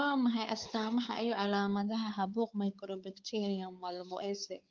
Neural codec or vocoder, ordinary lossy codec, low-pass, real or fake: codec, 16 kHz, 4 kbps, X-Codec, WavLM features, trained on Multilingual LibriSpeech; Opus, 24 kbps; 7.2 kHz; fake